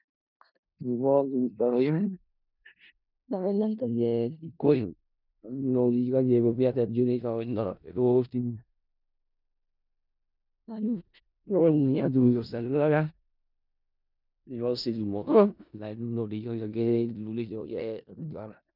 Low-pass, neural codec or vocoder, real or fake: 5.4 kHz; codec, 16 kHz in and 24 kHz out, 0.4 kbps, LongCat-Audio-Codec, four codebook decoder; fake